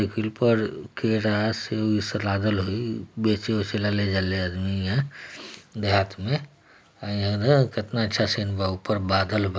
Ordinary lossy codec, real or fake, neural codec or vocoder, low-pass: none; real; none; none